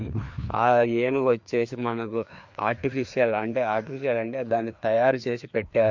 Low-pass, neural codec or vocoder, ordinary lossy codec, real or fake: 7.2 kHz; codec, 16 kHz, 2 kbps, FreqCodec, larger model; MP3, 48 kbps; fake